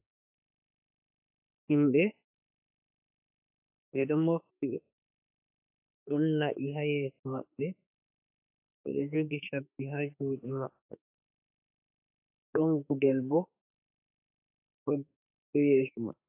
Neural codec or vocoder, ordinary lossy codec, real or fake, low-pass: autoencoder, 48 kHz, 32 numbers a frame, DAC-VAE, trained on Japanese speech; AAC, 32 kbps; fake; 3.6 kHz